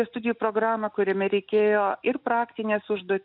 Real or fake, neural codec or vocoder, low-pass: real; none; 5.4 kHz